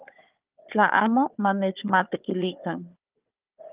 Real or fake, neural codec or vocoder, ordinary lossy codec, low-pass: fake; codec, 16 kHz, 4 kbps, FunCodec, trained on Chinese and English, 50 frames a second; Opus, 32 kbps; 3.6 kHz